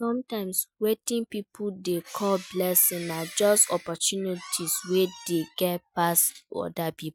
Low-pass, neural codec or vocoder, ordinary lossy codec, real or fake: none; none; none; real